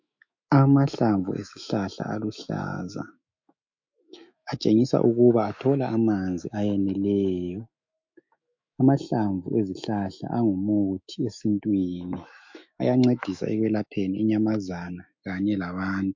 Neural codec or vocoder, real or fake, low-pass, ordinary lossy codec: autoencoder, 48 kHz, 128 numbers a frame, DAC-VAE, trained on Japanese speech; fake; 7.2 kHz; MP3, 48 kbps